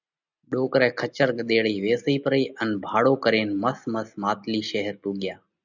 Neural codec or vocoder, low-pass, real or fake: none; 7.2 kHz; real